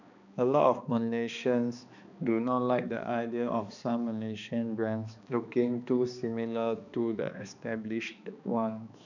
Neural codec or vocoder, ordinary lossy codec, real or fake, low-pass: codec, 16 kHz, 2 kbps, X-Codec, HuBERT features, trained on balanced general audio; none; fake; 7.2 kHz